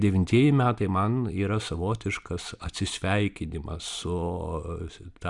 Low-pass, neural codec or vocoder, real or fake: 10.8 kHz; none; real